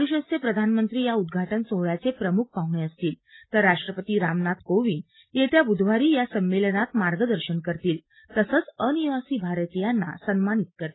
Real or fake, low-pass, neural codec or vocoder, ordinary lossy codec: real; 7.2 kHz; none; AAC, 16 kbps